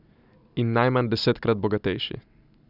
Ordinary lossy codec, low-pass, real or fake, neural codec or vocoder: none; 5.4 kHz; real; none